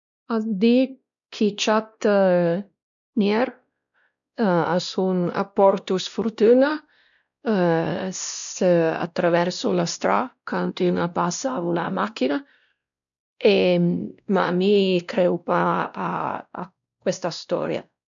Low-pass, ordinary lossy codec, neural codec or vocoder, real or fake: 7.2 kHz; none; codec, 16 kHz, 1 kbps, X-Codec, WavLM features, trained on Multilingual LibriSpeech; fake